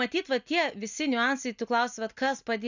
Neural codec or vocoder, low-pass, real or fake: none; 7.2 kHz; real